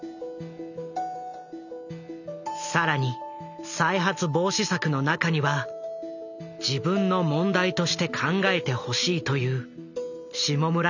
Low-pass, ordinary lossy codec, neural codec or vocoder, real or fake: 7.2 kHz; none; none; real